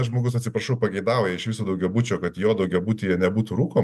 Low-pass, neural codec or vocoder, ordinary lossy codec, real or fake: 14.4 kHz; none; MP3, 96 kbps; real